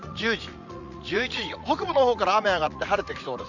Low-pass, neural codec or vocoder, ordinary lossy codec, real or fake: 7.2 kHz; vocoder, 44.1 kHz, 80 mel bands, Vocos; none; fake